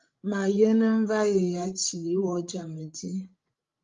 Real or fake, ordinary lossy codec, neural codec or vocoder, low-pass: fake; Opus, 32 kbps; codec, 16 kHz, 16 kbps, FreqCodec, larger model; 7.2 kHz